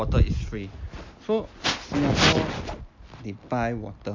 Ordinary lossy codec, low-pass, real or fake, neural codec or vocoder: MP3, 48 kbps; 7.2 kHz; real; none